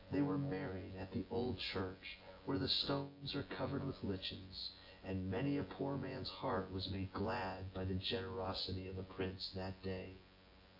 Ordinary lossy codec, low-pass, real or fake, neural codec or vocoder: AAC, 32 kbps; 5.4 kHz; fake; vocoder, 24 kHz, 100 mel bands, Vocos